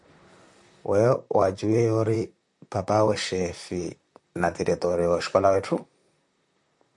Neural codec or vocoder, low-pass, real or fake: vocoder, 44.1 kHz, 128 mel bands, Pupu-Vocoder; 10.8 kHz; fake